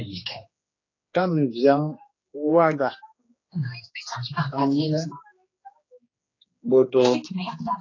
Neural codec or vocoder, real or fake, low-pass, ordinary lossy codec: codec, 16 kHz, 2 kbps, X-Codec, HuBERT features, trained on general audio; fake; 7.2 kHz; AAC, 32 kbps